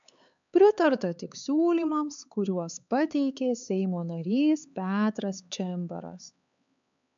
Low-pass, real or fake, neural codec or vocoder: 7.2 kHz; fake; codec, 16 kHz, 4 kbps, X-Codec, HuBERT features, trained on LibriSpeech